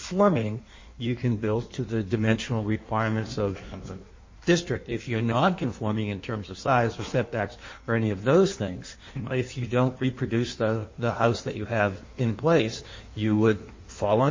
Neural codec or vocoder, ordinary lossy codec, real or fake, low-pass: codec, 16 kHz in and 24 kHz out, 1.1 kbps, FireRedTTS-2 codec; MP3, 32 kbps; fake; 7.2 kHz